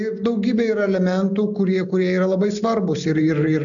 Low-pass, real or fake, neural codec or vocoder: 7.2 kHz; real; none